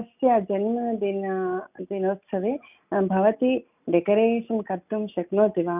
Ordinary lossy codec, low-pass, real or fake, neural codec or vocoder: none; 3.6 kHz; real; none